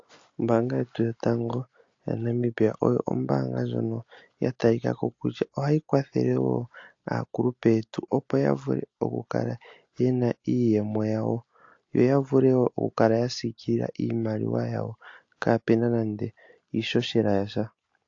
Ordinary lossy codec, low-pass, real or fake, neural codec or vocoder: AAC, 48 kbps; 7.2 kHz; real; none